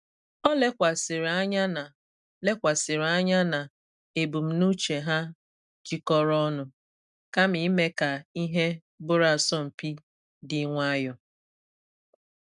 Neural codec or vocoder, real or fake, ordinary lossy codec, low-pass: none; real; none; 10.8 kHz